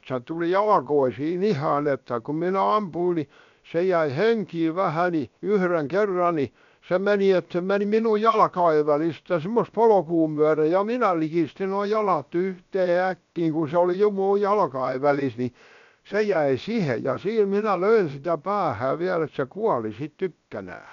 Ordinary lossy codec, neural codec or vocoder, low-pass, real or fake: none; codec, 16 kHz, about 1 kbps, DyCAST, with the encoder's durations; 7.2 kHz; fake